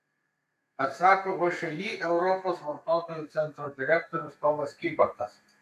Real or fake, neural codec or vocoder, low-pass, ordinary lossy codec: fake; codec, 32 kHz, 1.9 kbps, SNAC; 14.4 kHz; MP3, 96 kbps